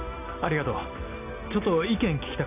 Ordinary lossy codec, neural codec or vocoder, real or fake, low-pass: none; none; real; 3.6 kHz